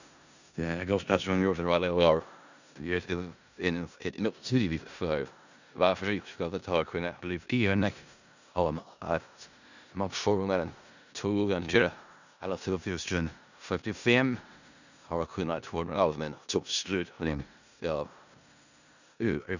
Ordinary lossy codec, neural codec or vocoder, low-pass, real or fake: none; codec, 16 kHz in and 24 kHz out, 0.4 kbps, LongCat-Audio-Codec, four codebook decoder; 7.2 kHz; fake